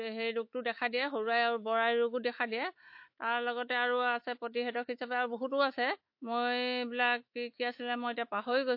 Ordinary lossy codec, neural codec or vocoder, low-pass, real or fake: MP3, 32 kbps; autoencoder, 48 kHz, 128 numbers a frame, DAC-VAE, trained on Japanese speech; 5.4 kHz; fake